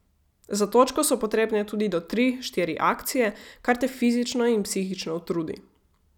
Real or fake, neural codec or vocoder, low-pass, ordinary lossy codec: real; none; 19.8 kHz; none